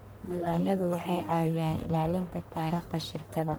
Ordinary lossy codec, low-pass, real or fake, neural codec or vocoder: none; none; fake; codec, 44.1 kHz, 1.7 kbps, Pupu-Codec